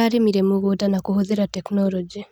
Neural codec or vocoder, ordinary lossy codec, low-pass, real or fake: none; none; 19.8 kHz; real